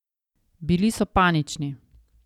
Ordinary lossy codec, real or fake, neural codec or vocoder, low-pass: none; real; none; 19.8 kHz